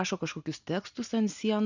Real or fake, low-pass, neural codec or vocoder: real; 7.2 kHz; none